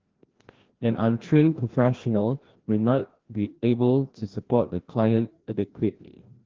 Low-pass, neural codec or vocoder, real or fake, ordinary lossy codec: 7.2 kHz; codec, 16 kHz, 1 kbps, FreqCodec, larger model; fake; Opus, 16 kbps